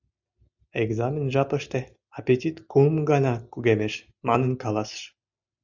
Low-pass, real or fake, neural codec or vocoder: 7.2 kHz; real; none